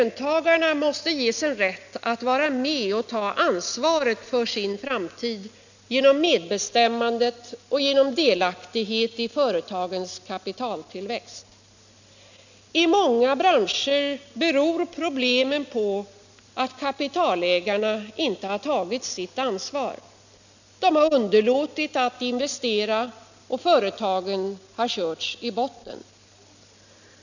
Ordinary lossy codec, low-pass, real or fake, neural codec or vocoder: none; 7.2 kHz; real; none